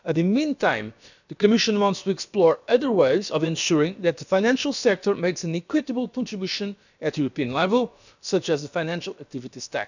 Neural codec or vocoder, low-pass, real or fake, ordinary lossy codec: codec, 16 kHz, about 1 kbps, DyCAST, with the encoder's durations; 7.2 kHz; fake; none